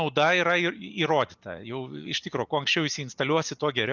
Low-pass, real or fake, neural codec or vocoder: 7.2 kHz; real; none